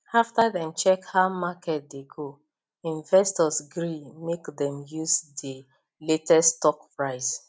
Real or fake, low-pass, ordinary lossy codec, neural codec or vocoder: real; none; none; none